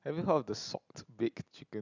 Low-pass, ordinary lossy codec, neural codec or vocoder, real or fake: 7.2 kHz; none; none; real